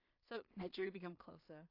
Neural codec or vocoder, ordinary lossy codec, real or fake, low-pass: codec, 16 kHz in and 24 kHz out, 0.4 kbps, LongCat-Audio-Codec, two codebook decoder; AAC, 32 kbps; fake; 5.4 kHz